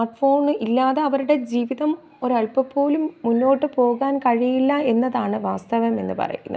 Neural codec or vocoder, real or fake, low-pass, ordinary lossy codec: none; real; none; none